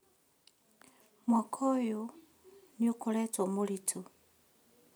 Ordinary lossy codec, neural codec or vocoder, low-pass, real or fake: none; none; none; real